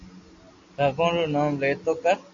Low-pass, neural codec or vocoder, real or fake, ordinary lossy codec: 7.2 kHz; none; real; AAC, 48 kbps